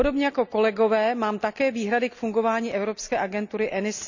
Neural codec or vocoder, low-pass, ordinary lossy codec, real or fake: none; 7.2 kHz; none; real